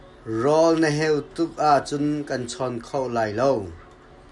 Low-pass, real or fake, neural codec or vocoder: 10.8 kHz; real; none